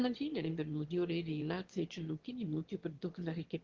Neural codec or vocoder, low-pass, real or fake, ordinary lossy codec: autoencoder, 22.05 kHz, a latent of 192 numbers a frame, VITS, trained on one speaker; 7.2 kHz; fake; Opus, 16 kbps